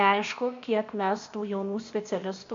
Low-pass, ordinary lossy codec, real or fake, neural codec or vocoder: 7.2 kHz; MP3, 48 kbps; fake; codec, 16 kHz, 0.8 kbps, ZipCodec